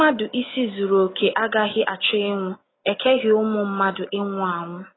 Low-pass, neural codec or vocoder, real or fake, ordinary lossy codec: 7.2 kHz; none; real; AAC, 16 kbps